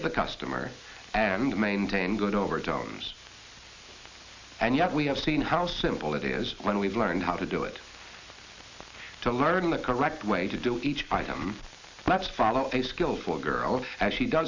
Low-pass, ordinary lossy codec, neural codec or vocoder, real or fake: 7.2 kHz; MP3, 64 kbps; none; real